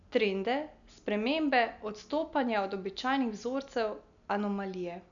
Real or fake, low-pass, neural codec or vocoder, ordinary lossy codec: real; 7.2 kHz; none; none